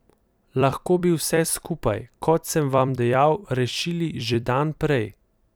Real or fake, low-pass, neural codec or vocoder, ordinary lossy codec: fake; none; vocoder, 44.1 kHz, 128 mel bands every 256 samples, BigVGAN v2; none